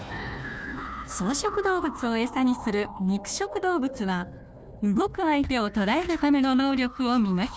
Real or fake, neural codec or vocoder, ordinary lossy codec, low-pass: fake; codec, 16 kHz, 1 kbps, FunCodec, trained on Chinese and English, 50 frames a second; none; none